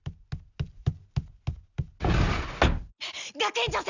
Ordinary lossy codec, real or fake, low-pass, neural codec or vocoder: none; real; 7.2 kHz; none